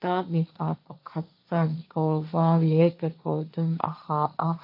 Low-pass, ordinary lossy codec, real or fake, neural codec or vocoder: 5.4 kHz; MP3, 32 kbps; fake; codec, 16 kHz, 1.1 kbps, Voila-Tokenizer